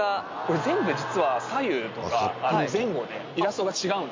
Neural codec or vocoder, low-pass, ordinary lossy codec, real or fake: none; 7.2 kHz; MP3, 48 kbps; real